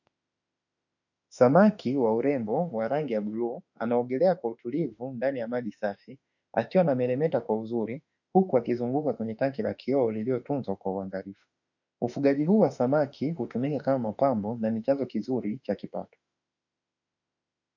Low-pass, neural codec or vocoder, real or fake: 7.2 kHz; autoencoder, 48 kHz, 32 numbers a frame, DAC-VAE, trained on Japanese speech; fake